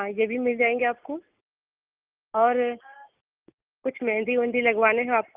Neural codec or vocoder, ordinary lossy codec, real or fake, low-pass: none; Opus, 24 kbps; real; 3.6 kHz